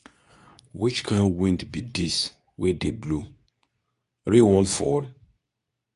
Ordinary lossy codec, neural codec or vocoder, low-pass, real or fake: none; codec, 24 kHz, 0.9 kbps, WavTokenizer, medium speech release version 2; 10.8 kHz; fake